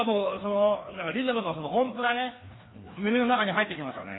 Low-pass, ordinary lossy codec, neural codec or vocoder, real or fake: 7.2 kHz; AAC, 16 kbps; codec, 16 kHz, 2 kbps, FreqCodec, larger model; fake